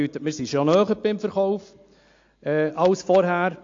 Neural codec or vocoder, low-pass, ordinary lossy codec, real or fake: none; 7.2 kHz; AAC, 48 kbps; real